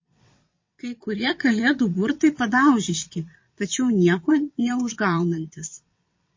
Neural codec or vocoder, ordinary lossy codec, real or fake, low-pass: vocoder, 22.05 kHz, 80 mel bands, WaveNeXt; MP3, 32 kbps; fake; 7.2 kHz